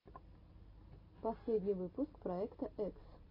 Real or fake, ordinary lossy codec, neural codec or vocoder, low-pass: real; MP3, 32 kbps; none; 5.4 kHz